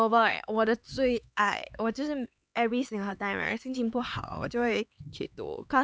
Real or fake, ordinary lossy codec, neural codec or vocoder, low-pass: fake; none; codec, 16 kHz, 4 kbps, X-Codec, HuBERT features, trained on LibriSpeech; none